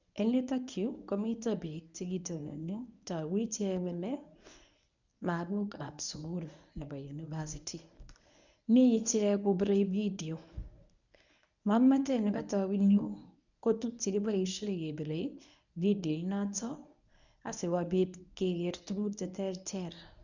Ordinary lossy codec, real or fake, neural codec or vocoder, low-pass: none; fake; codec, 24 kHz, 0.9 kbps, WavTokenizer, medium speech release version 1; 7.2 kHz